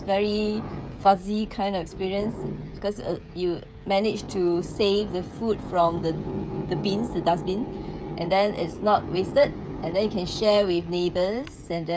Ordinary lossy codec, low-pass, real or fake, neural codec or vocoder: none; none; fake; codec, 16 kHz, 16 kbps, FreqCodec, smaller model